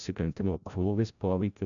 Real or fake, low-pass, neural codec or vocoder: fake; 7.2 kHz; codec, 16 kHz, 0.5 kbps, FreqCodec, larger model